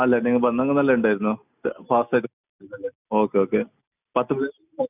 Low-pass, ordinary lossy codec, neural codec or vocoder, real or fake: 3.6 kHz; none; none; real